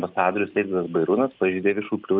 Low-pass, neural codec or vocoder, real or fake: 5.4 kHz; none; real